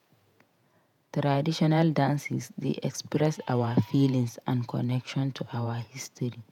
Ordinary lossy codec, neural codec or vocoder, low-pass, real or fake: none; vocoder, 48 kHz, 128 mel bands, Vocos; 19.8 kHz; fake